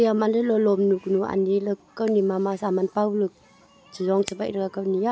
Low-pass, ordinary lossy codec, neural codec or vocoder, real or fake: none; none; none; real